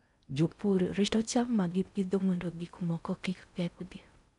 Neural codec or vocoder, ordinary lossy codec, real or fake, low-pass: codec, 16 kHz in and 24 kHz out, 0.6 kbps, FocalCodec, streaming, 4096 codes; none; fake; 10.8 kHz